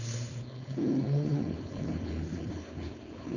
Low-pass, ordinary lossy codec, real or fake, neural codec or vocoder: 7.2 kHz; none; fake; codec, 16 kHz, 4.8 kbps, FACodec